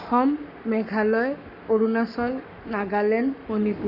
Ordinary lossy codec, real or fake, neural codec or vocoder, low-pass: none; fake; codec, 44.1 kHz, 7.8 kbps, Pupu-Codec; 5.4 kHz